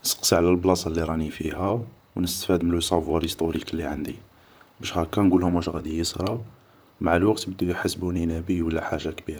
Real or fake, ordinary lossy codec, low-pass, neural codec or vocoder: fake; none; none; vocoder, 44.1 kHz, 128 mel bands, Pupu-Vocoder